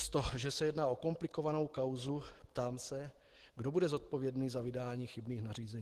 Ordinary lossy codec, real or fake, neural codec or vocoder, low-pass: Opus, 16 kbps; fake; autoencoder, 48 kHz, 128 numbers a frame, DAC-VAE, trained on Japanese speech; 14.4 kHz